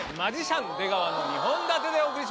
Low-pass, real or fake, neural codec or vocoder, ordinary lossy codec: none; real; none; none